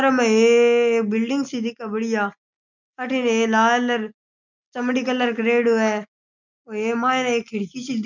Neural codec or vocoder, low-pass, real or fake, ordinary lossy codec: none; 7.2 kHz; real; none